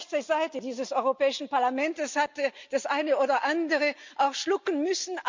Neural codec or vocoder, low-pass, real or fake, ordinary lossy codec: none; 7.2 kHz; real; MP3, 64 kbps